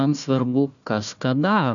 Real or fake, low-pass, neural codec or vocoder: fake; 7.2 kHz; codec, 16 kHz, 1 kbps, FunCodec, trained on Chinese and English, 50 frames a second